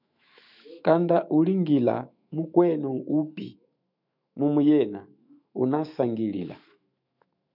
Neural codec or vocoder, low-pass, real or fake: autoencoder, 48 kHz, 128 numbers a frame, DAC-VAE, trained on Japanese speech; 5.4 kHz; fake